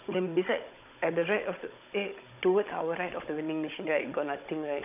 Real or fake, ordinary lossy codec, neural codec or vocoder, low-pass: fake; none; codec, 16 kHz, 16 kbps, FunCodec, trained on Chinese and English, 50 frames a second; 3.6 kHz